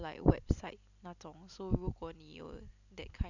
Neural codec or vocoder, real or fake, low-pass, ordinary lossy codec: none; real; 7.2 kHz; none